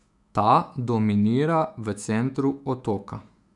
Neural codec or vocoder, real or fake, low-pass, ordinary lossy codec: autoencoder, 48 kHz, 128 numbers a frame, DAC-VAE, trained on Japanese speech; fake; 10.8 kHz; none